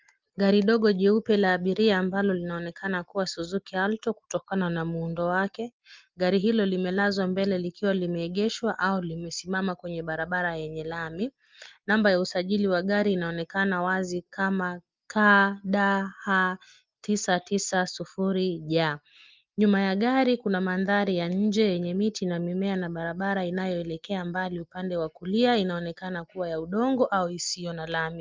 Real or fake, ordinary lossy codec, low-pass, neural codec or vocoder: real; Opus, 32 kbps; 7.2 kHz; none